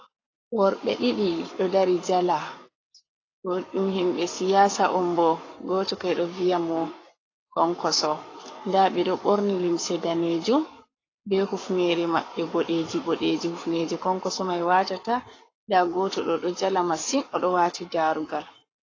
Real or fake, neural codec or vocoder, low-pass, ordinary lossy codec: fake; codec, 44.1 kHz, 7.8 kbps, Pupu-Codec; 7.2 kHz; AAC, 32 kbps